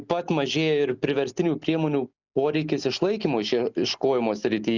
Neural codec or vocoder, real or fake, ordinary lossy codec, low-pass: none; real; Opus, 64 kbps; 7.2 kHz